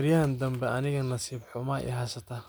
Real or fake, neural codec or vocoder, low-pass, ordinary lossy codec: real; none; none; none